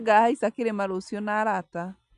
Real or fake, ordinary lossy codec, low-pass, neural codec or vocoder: real; none; 10.8 kHz; none